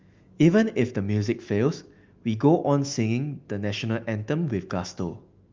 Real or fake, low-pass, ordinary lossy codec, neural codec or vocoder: fake; 7.2 kHz; Opus, 32 kbps; autoencoder, 48 kHz, 128 numbers a frame, DAC-VAE, trained on Japanese speech